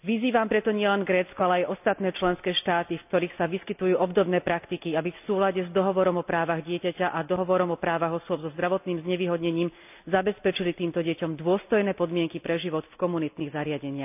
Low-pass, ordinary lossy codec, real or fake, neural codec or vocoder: 3.6 kHz; none; real; none